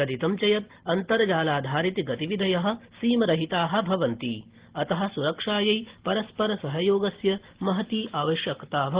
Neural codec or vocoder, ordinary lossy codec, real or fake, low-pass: none; Opus, 16 kbps; real; 3.6 kHz